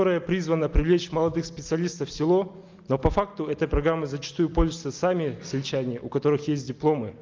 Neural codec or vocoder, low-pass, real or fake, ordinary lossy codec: none; 7.2 kHz; real; Opus, 32 kbps